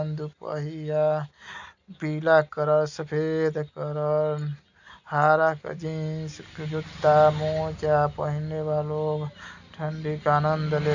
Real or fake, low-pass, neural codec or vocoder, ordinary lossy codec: real; 7.2 kHz; none; none